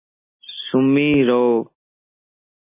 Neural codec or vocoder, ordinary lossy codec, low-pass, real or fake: none; MP3, 24 kbps; 3.6 kHz; real